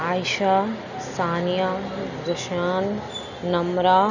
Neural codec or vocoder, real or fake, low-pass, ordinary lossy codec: none; real; 7.2 kHz; none